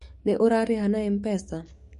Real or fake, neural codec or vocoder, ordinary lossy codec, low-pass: fake; codec, 44.1 kHz, 7.8 kbps, DAC; MP3, 48 kbps; 14.4 kHz